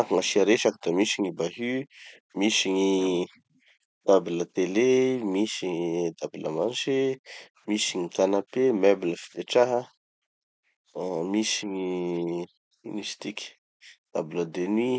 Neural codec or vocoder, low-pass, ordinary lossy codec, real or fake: none; none; none; real